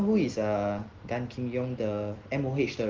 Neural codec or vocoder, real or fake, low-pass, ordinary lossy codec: none; real; 7.2 kHz; Opus, 16 kbps